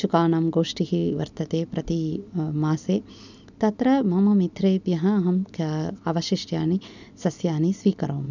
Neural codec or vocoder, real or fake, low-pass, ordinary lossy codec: none; real; 7.2 kHz; none